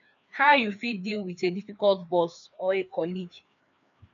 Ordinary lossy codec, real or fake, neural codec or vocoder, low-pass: none; fake; codec, 16 kHz, 2 kbps, FreqCodec, larger model; 7.2 kHz